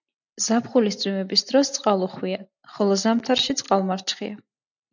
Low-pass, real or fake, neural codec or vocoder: 7.2 kHz; real; none